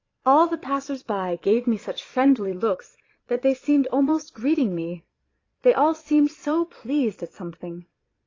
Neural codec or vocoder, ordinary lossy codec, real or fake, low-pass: vocoder, 22.05 kHz, 80 mel bands, Vocos; AAC, 32 kbps; fake; 7.2 kHz